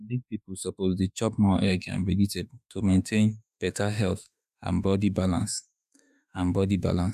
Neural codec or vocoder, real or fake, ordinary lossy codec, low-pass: autoencoder, 48 kHz, 32 numbers a frame, DAC-VAE, trained on Japanese speech; fake; none; 14.4 kHz